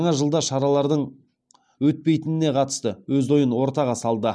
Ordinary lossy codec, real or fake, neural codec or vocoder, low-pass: none; real; none; none